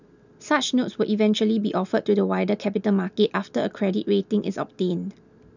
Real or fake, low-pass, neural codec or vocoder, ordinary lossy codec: real; 7.2 kHz; none; none